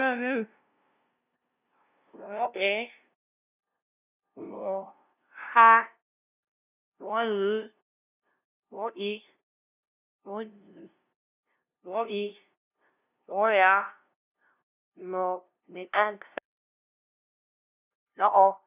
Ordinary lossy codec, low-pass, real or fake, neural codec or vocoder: none; 3.6 kHz; fake; codec, 16 kHz, 0.5 kbps, FunCodec, trained on LibriTTS, 25 frames a second